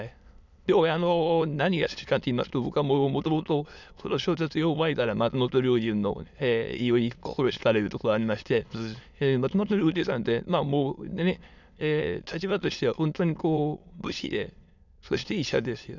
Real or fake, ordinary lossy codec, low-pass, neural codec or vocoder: fake; none; 7.2 kHz; autoencoder, 22.05 kHz, a latent of 192 numbers a frame, VITS, trained on many speakers